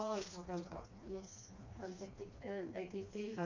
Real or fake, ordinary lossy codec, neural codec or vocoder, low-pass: fake; MP3, 48 kbps; codec, 16 kHz, 2 kbps, FreqCodec, smaller model; 7.2 kHz